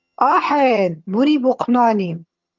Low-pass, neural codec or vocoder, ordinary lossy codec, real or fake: 7.2 kHz; vocoder, 22.05 kHz, 80 mel bands, HiFi-GAN; Opus, 32 kbps; fake